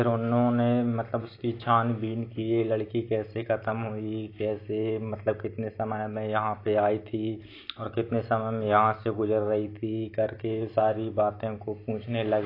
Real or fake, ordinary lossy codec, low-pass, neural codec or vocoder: real; AAC, 32 kbps; 5.4 kHz; none